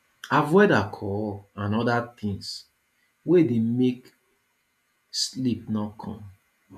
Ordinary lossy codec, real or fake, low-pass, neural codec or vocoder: none; fake; 14.4 kHz; vocoder, 48 kHz, 128 mel bands, Vocos